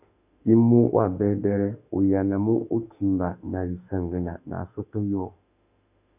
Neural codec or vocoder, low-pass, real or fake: autoencoder, 48 kHz, 32 numbers a frame, DAC-VAE, trained on Japanese speech; 3.6 kHz; fake